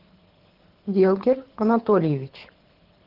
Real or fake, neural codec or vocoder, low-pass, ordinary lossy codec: fake; codec, 24 kHz, 6 kbps, HILCodec; 5.4 kHz; Opus, 16 kbps